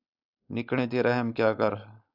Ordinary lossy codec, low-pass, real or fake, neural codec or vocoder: AAC, 48 kbps; 5.4 kHz; fake; codec, 16 kHz, 4.8 kbps, FACodec